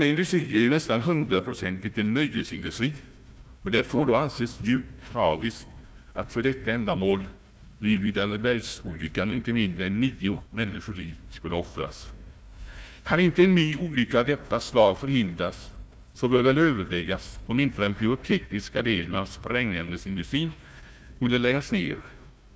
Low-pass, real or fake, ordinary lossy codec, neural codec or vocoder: none; fake; none; codec, 16 kHz, 1 kbps, FunCodec, trained on Chinese and English, 50 frames a second